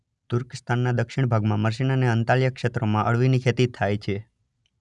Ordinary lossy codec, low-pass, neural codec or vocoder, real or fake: none; 10.8 kHz; none; real